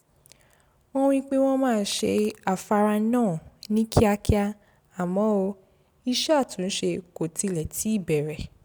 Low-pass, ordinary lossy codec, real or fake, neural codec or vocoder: none; none; real; none